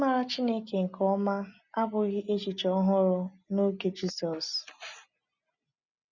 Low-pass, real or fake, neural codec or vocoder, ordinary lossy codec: 7.2 kHz; real; none; none